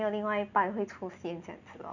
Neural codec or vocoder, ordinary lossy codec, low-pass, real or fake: none; none; 7.2 kHz; real